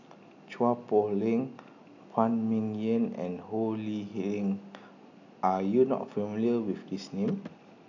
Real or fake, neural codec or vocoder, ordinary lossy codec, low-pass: real; none; none; 7.2 kHz